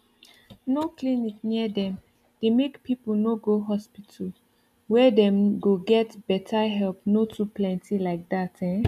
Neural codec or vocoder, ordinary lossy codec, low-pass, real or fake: none; none; 14.4 kHz; real